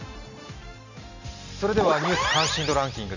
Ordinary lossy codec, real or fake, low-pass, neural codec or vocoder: none; real; 7.2 kHz; none